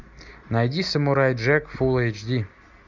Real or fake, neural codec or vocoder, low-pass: real; none; 7.2 kHz